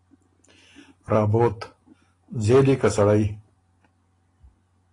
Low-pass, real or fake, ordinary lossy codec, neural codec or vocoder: 10.8 kHz; real; AAC, 32 kbps; none